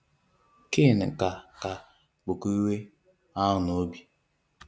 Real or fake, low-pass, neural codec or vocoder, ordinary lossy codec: real; none; none; none